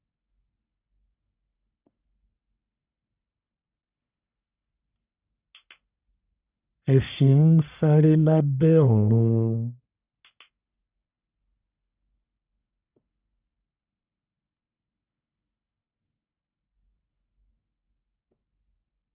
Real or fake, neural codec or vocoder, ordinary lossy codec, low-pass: fake; codec, 44.1 kHz, 1.7 kbps, Pupu-Codec; Opus, 64 kbps; 3.6 kHz